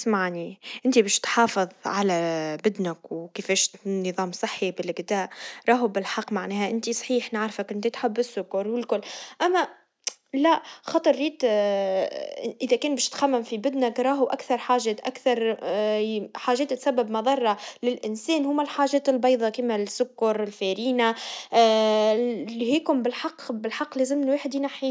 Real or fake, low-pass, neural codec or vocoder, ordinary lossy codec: real; none; none; none